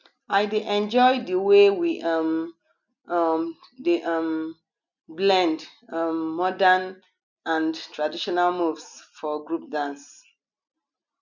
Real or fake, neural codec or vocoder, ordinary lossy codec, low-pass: real; none; none; 7.2 kHz